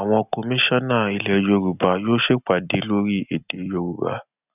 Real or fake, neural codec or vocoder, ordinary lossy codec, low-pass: real; none; none; 3.6 kHz